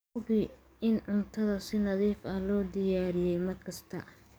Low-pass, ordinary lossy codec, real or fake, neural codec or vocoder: none; none; fake; codec, 44.1 kHz, 7.8 kbps, DAC